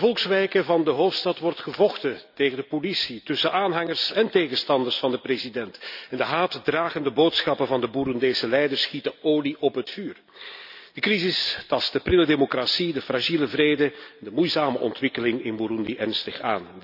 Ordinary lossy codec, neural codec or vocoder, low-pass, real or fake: none; none; 5.4 kHz; real